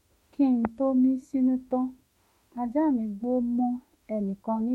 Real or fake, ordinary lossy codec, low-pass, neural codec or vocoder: fake; MP3, 64 kbps; 19.8 kHz; autoencoder, 48 kHz, 32 numbers a frame, DAC-VAE, trained on Japanese speech